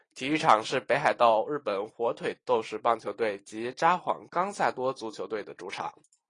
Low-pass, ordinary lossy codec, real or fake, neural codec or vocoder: 9.9 kHz; AAC, 32 kbps; real; none